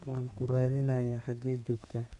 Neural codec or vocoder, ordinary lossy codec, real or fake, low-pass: codec, 32 kHz, 1.9 kbps, SNAC; none; fake; 10.8 kHz